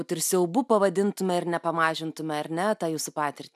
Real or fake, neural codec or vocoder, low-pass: real; none; 14.4 kHz